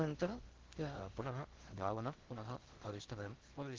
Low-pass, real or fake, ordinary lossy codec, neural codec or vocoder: 7.2 kHz; fake; Opus, 16 kbps; codec, 16 kHz in and 24 kHz out, 0.6 kbps, FocalCodec, streaming, 4096 codes